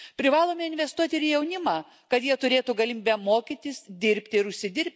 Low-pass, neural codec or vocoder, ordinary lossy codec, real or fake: none; none; none; real